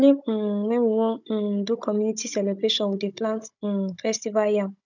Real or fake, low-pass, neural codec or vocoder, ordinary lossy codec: fake; 7.2 kHz; codec, 16 kHz, 16 kbps, FunCodec, trained on Chinese and English, 50 frames a second; none